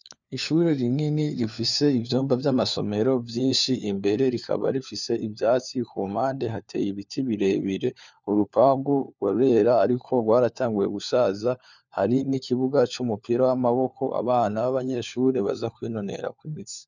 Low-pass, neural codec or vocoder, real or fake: 7.2 kHz; codec, 16 kHz, 4 kbps, FunCodec, trained on LibriTTS, 50 frames a second; fake